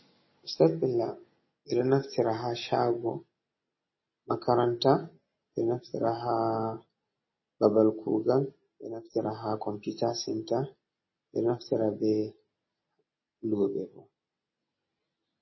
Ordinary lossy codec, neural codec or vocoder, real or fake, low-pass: MP3, 24 kbps; none; real; 7.2 kHz